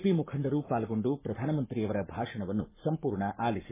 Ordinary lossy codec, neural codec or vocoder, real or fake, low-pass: MP3, 16 kbps; codec, 44.1 kHz, 7.8 kbps, Pupu-Codec; fake; 3.6 kHz